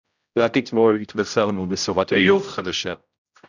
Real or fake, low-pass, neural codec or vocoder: fake; 7.2 kHz; codec, 16 kHz, 0.5 kbps, X-Codec, HuBERT features, trained on general audio